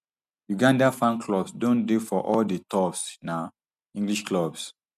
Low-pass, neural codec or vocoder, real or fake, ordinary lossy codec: 14.4 kHz; none; real; none